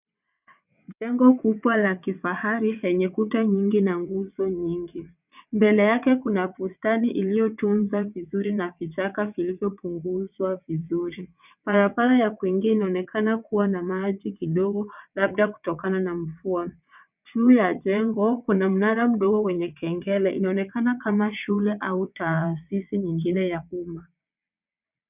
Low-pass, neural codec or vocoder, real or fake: 3.6 kHz; vocoder, 22.05 kHz, 80 mel bands, WaveNeXt; fake